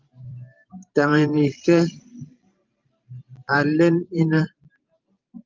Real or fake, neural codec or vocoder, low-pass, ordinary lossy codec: fake; vocoder, 44.1 kHz, 128 mel bands every 512 samples, BigVGAN v2; 7.2 kHz; Opus, 24 kbps